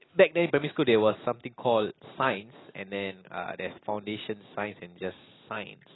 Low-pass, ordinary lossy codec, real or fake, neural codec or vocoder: 7.2 kHz; AAC, 16 kbps; real; none